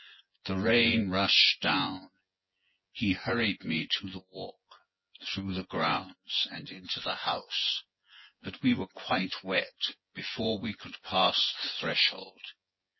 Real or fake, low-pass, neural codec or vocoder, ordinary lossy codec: fake; 7.2 kHz; vocoder, 24 kHz, 100 mel bands, Vocos; MP3, 24 kbps